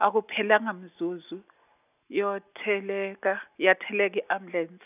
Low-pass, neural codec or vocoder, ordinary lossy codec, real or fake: 3.6 kHz; none; none; real